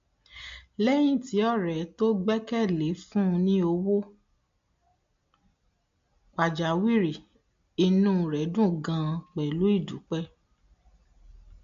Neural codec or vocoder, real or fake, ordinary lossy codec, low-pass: none; real; MP3, 48 kbps; 7.2 kHz